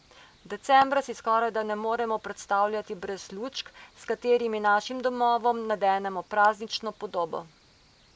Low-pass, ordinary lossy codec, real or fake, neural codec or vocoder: none; none; real; none